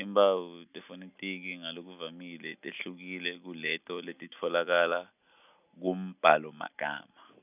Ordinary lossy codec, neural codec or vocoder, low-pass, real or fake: none; none; 3.6 kHz; real